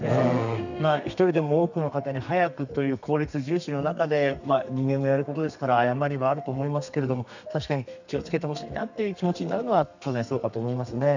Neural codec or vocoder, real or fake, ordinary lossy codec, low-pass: codec, 32 kHz, 1.9 kbps, SNAC; fake; none; 7.2 kHz